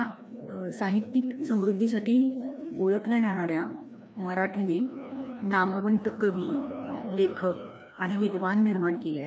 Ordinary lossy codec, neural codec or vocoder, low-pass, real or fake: none; codec, 16 kHz, 1 kbps, FreqCodec, larger model; none; fake